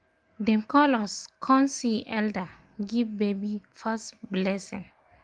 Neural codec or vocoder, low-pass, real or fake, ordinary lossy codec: none; 9.9 kHz; real; Opus, 24 kbps